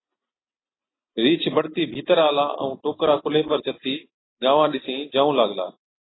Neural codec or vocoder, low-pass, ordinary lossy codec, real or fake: none; 7.2 kHz; AAC, 16 kbps; real